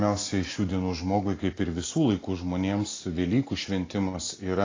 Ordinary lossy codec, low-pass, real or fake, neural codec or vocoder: AAC, 32 kbps; 7.2 kHz; real; none